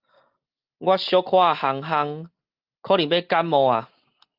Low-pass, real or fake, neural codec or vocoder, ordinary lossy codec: 5.4 kHz; real; none; Opus, 24 kbps